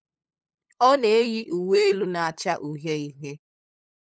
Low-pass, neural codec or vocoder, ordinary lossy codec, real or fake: none; codec, 16 kHz, 8 kbps, FunCodec, trained on LibriTTS, 25 frames a second; none; fake